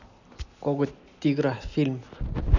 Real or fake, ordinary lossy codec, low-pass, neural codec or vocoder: real; none; 7.2 kHz; none